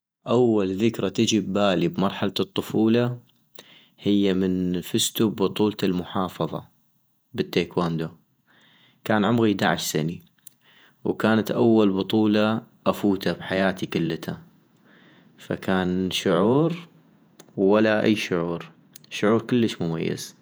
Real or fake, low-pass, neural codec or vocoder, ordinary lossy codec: fake; none; vocoder, 48 kHz, 128 mel bands, Vocos; none